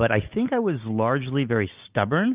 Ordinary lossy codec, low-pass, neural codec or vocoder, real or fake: Opus, 32 kbps; 3.6 kHz; none; real